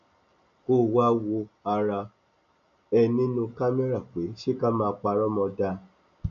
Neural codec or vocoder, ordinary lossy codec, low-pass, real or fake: none; MP3, 96 kbps; 7.2 kHz; real